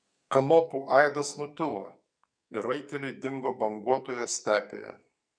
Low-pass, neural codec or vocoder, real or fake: 9.9 kHz; codec, 44.1 kHz, 2.6 kbps, SNAC; fake